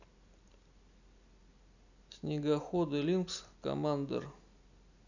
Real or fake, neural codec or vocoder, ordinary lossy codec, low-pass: real; none; none; 7.2 kHz